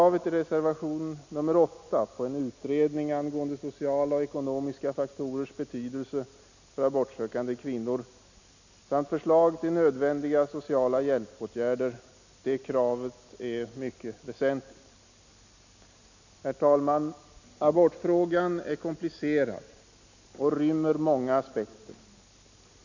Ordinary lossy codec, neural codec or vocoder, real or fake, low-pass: none; none; real; 7.2 kHz